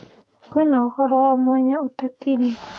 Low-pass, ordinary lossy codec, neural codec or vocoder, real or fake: 10.8 kHz; MP3, 64 kbps; codec, 32 kHz, 1.9 kbps, SNAC; fake